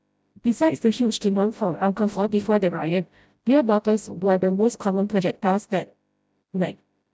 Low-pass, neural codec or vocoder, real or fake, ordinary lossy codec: none; codec, 16 kHz, 0.5 kbps, FreqCodec, smaller model; fake; none